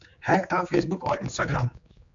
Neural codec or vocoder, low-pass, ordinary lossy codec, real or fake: codec, 16 kHz, 4 kbps, X-Codec, HuBERT features, trained on general audio; 7.2 kHz; AAC, 64 kbps; fake